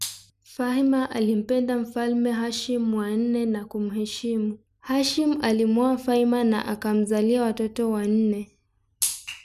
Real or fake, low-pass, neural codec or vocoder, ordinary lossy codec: real; 14.4 kHz; none; none